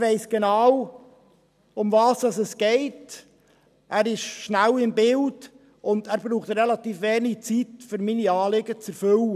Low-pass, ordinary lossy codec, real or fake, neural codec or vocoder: 14.4 kHz; none; real; none